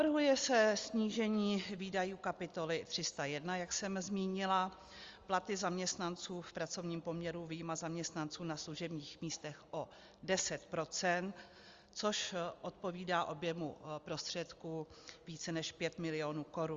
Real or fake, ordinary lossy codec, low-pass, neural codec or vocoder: real; Opus, 32 kbps; 7.2 kHz; none